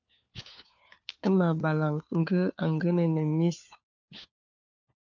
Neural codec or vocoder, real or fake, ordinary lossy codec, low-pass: codec, 16 kHz, 4 kbps, FunCodec, trained on LibriTTS, 50 frames a second; fake; MP3, 64 kbps; 7.2 kHz